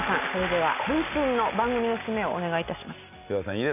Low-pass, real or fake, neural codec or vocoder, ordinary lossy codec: 3.6 kHz; real; none; none